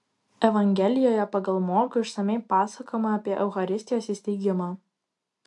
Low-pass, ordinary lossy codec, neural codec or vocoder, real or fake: 10.8 kHz; AAC, 64 kbps; none; real